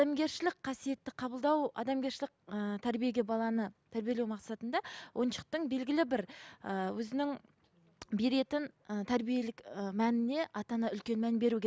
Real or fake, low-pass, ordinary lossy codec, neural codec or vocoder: real; none; none; none